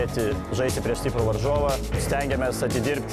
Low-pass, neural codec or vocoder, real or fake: 14.4 kHz; none; real